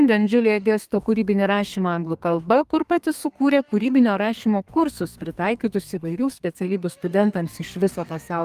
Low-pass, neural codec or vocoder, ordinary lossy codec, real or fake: 14.4 kHz; codec, 32 kHz, 1.9 kbps, SNAC; Opus, 32 kbps; fake